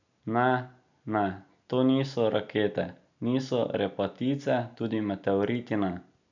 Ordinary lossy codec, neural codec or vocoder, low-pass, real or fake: none; none; 7.2 kHz; real